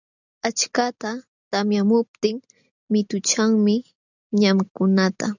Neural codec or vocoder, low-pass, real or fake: none; 7.2 kHz; real